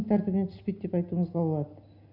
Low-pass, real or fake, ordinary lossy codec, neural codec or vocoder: 5.4 kHz; real; none; none